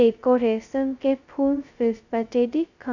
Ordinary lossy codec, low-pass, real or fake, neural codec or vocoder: none; 7.2 kHz; fake; codec, 16 kHz, 0.2 kbps, FocalCodec